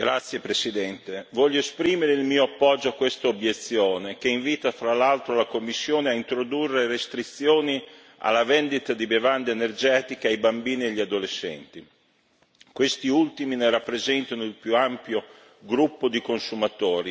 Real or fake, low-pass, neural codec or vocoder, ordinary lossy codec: real; none; none; none